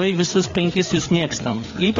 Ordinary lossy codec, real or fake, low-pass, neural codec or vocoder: AAC, 32 kbps; fake; 7.2 kHz; codec, 16 kHz, 4 kbps, FreqCodec, larger model